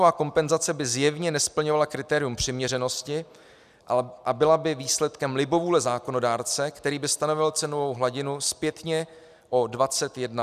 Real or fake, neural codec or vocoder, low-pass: real; none; 14.4 kHz